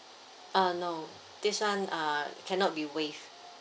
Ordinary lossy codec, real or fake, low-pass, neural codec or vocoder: none; real; none; none